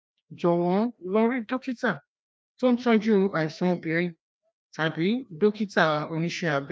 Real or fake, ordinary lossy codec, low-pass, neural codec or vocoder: fake; none; none; codec, 16 kHz, 1 kbps, FreqCodec, larger model